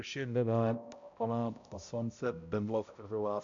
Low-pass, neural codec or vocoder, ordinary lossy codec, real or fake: 7.2 kHz; codec, 16 kHz, 0.5 kbps, X-Codec, HuBERT features, trained on balanced general audio; AAC, 64 kbps; fake